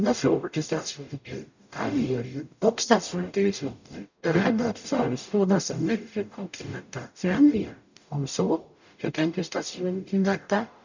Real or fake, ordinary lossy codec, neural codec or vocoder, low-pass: fake; none; codec, 44.1 kHz, 0.9 kbps, DAC; 7.2 kHz